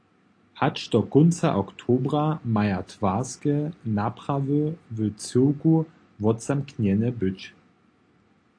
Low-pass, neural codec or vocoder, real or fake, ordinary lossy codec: 9.9 kHz; none; real; AAC, 48 kbps